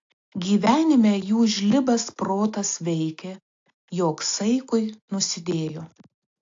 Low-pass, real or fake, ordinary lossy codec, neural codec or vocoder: 7.2 kHz; real; AAC, 48 kbps; none